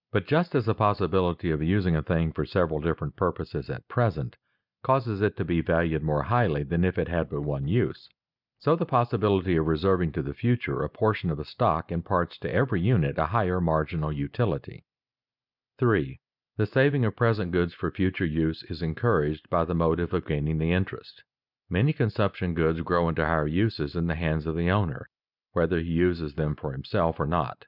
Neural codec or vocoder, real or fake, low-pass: none; real; 5.4 kHz